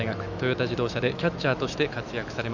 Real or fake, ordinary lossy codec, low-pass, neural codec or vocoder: fake; none; 7.2 kHz; autoencoder, 48 kHz, 128 numbers a frame, DAC-VAE, trained on Japanese speech